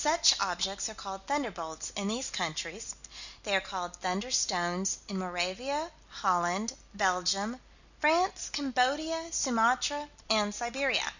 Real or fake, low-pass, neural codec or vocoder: real; 7.2 kHz; none